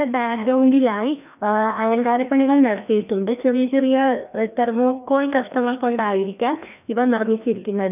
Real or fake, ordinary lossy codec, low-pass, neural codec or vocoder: fake; none; 3.6 kHz; codec, 16 kHz, 1 kbps, FreqCodec, larger model